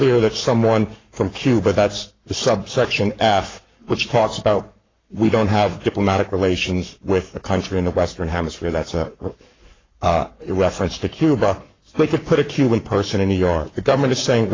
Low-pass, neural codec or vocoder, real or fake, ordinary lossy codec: 7.2 kHz; codec, 44.1 kHz, 7.8 kbps, Pupu-Codec; fake; AAC, 32 kbps